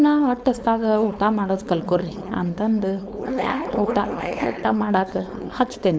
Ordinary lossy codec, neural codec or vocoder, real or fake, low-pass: none; codec, 16 kHz, 4.8 kbps, FACodec; fake; none